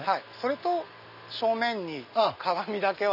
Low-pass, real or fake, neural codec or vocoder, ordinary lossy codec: 5.4 kHz; real; none; none